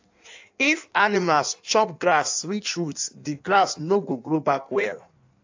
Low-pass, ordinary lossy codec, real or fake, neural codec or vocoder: 7.2 kHz; AAC, 48 kbps; fake; codec, 16 kHz in and 24 kHz out, 1.1 kbps, FireRedTTS-2 codec